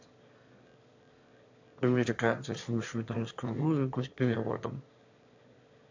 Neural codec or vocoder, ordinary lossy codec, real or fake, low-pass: autoencoder, 22.05 kHz, a latent of 192 numbers a frame, VITS, trained on one speaker; AAC, 48 kbps; fake; 7.2 kHz